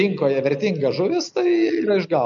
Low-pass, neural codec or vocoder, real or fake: 7.2 kHz; none; real